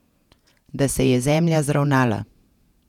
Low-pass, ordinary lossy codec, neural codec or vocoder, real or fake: 19.8 kHz; none; vocoder, 48 kHz, 128 mel bands, Vocos; fake